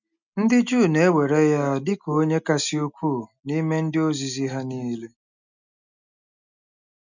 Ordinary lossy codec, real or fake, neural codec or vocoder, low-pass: none; real; none; 7.2 kHz